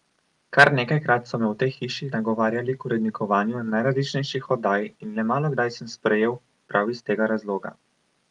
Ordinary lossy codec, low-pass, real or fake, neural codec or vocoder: Opus, 32 kbps; 10.8 kHz; real; none